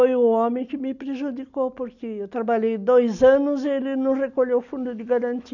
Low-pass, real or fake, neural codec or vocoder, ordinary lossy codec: 7.2 kHz; real; none; none